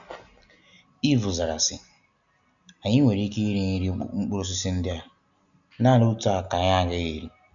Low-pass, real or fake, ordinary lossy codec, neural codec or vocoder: 7.2 kHz; real; none; none